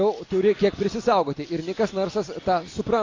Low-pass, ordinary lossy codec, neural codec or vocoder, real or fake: 7.2 kHz; AAC, 32 kbps; none; real